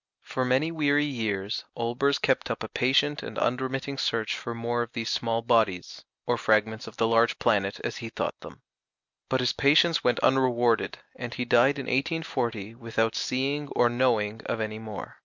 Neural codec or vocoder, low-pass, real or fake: none; 7.2 kHz; real